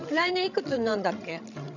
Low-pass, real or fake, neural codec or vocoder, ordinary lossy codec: 7.2 kHz; fake; codec, 16 kHz, 16 kbps, FreqCodec, larger model; none